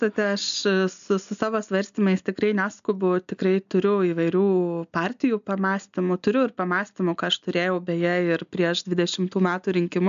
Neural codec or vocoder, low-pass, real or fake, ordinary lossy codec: none; 7.2 kHz; real; AAC, 96 kbps